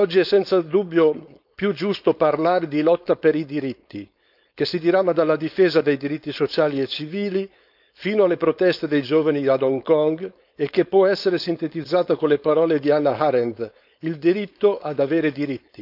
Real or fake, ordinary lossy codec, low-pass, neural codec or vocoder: fake; none; 5.4 kHz; codec, 16 kHz, 4.8 kbps, FACodec